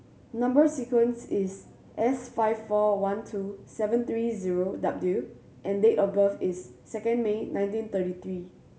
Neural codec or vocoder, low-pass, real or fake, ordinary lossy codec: none; none; real; none